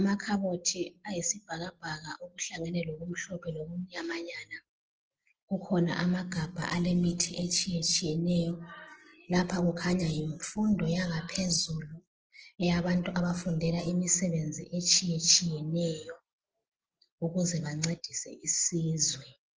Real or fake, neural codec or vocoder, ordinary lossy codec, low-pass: real; none; Opus, 16 kbps; 7.2 kHz